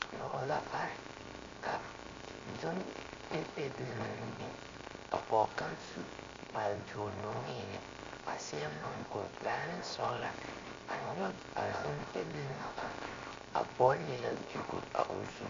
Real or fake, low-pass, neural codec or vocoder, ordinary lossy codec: fake; 7.2 kHz; codec, 16 kHz, 0.7 kbps, FocalCodec; MP3, 48 kbps